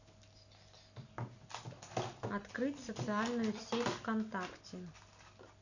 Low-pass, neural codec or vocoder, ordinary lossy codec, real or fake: 7.2 kHz; none; none; real